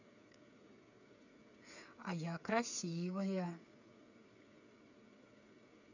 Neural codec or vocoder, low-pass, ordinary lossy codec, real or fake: codec, 16 kHz, 8 kbps, FreqCodec, smaller model; 7.2 kHz; none; fake